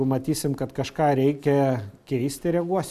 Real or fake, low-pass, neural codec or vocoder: real; 14.4 kHz; none